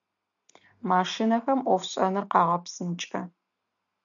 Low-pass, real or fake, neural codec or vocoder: 7.2 kHz; real; none